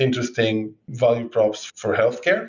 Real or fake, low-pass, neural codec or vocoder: real; 7.2 kHz; none